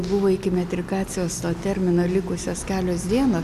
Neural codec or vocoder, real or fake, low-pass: none; real; 14.4 kHz